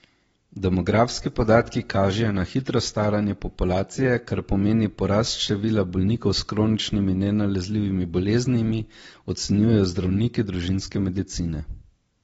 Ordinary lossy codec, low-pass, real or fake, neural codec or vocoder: AAC, 24 kbps; 19.8 kHz; real; none